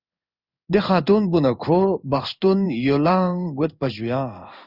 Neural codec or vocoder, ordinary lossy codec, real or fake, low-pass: codec, 16 kHz in and 24 kHz out, 1 kbps, XY-Tokenizer; Opus, 64 kbps; fake; 5.4 kHz